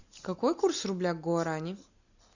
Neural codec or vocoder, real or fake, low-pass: none; real; 7.2 kHz